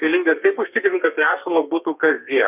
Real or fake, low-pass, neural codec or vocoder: fake; 3.6 kHz; codec, 32 kHz, 1.9 kbps, SNAC